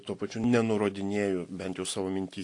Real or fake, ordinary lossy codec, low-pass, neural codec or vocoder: real; AAC, 48 kbps; 10.8 kHz; none